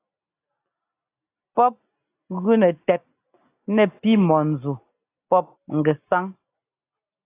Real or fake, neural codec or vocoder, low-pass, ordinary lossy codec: real; none; 3.6 kHz; AAC, 24 kbps